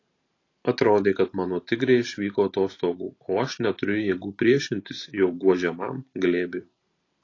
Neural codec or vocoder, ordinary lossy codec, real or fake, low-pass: vocoder, 44.1 kHz, 128 mel bands every 512 samples, BigVGAN v2; AAC, 32 kbps; fake; 7.2 kHz